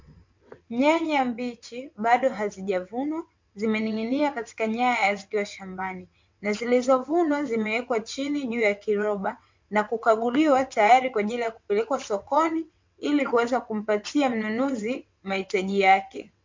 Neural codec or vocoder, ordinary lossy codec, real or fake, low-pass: vocoder, 22.05 kHz, 80 mel bands, WaveNeXt; MP3, 48 kbps; fake; 7.2 kHz